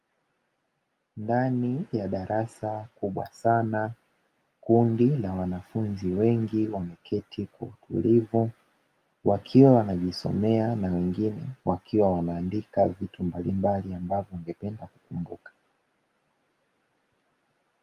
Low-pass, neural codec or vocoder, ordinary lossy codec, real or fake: 14.4 kHz; none; Opus, 32 kbps; real